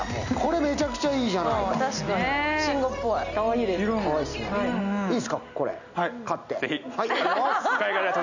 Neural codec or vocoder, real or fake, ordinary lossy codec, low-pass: none; real; none; 7.2 kHz